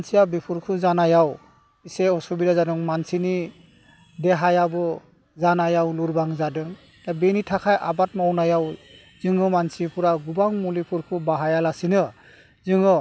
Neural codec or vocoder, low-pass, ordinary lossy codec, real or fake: none; none; none; real